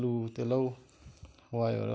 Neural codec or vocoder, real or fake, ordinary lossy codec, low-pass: none; real; none; none